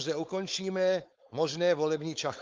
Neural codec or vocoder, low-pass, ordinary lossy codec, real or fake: codec, 16 kHz, 4.8 kbps, FACodec; 7.2 kHz; Opus, 24 kbps; fake